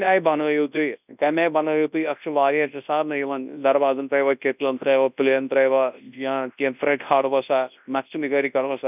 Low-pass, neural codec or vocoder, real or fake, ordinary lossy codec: 3.6 kHz; codec, 24 kHz, 0.9 kbps, WavTokenizer, large speech release; fake; none